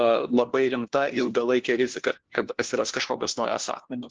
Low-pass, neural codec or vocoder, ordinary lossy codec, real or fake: 7.2 kHz; codec, 16 kHz, 1 kbps, FunCodec, trained on LibriTTS, 50 frames a second; Opus, 16 kbps; fake